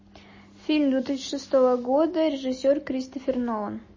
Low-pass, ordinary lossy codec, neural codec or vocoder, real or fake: 7.2 kHz; MP3, 32 kbps; none; real